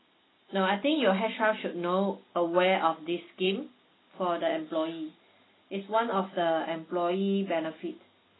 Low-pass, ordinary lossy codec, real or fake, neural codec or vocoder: 7.2 kHz; AAC, 16 kbps; real; none